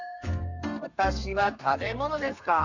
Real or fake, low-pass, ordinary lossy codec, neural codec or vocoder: fake; 7.2 kHz; none; codec, 32 kHz, 1.9 kbps, SNAC